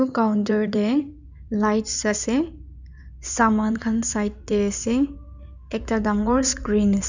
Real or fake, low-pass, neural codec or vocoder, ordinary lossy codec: fake; 7.2 kHz; codec, 16 kHz in and 24 kHz out, 2.2 kbps, FireRedTTS-2 codec; none